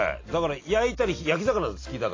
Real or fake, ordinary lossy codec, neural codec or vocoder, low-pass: real; AAC, 32 kbps; none; 7.2 kHz